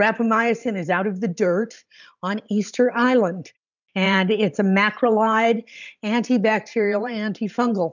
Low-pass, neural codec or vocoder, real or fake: 7.2 kHz; vocoder, 44.1 kHz, 128 mel bands every 512 samples, BigVGAN v2; fake